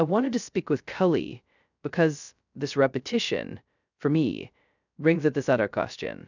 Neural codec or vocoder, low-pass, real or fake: codec, 16 kHz, 0.2 kbps, FocalCodec; 7.2 kHz; fake